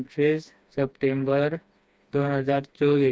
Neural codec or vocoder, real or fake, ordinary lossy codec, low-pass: codec, 16 kHz, 2 kbps, FreqCodec, smaller model; fake; none; none